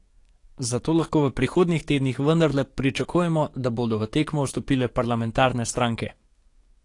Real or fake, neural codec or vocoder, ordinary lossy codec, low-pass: fake; codec, 44.1 kHz, 7.8 kbps, DAC; AAC, 48 kbps; 10.8 kHz